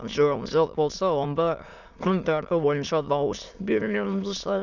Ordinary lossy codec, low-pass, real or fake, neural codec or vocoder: none; 7.2 kHz; fake; autoencoder, 22.05 kHz, a latent of 192 numbers a frame, VITS, trained on many speakers